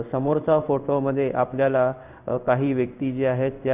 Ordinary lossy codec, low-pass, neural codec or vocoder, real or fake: none; 3.6 kHz; codec, 16 kHz in and 24 kHz out, 1 kbps, XY-Tokenizer; fake